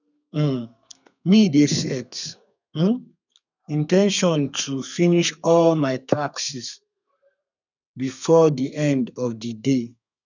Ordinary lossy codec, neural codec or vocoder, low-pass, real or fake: none; codec, 32 kHz, 1.9 kbps, SNAC; 7.2 kHz; fake